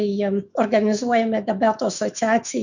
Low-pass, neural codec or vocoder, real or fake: 7.2 kHz; autoencoder, 48 kHz, 128 numbers a frame, DAC-VAE, trained on Japanese speech; fake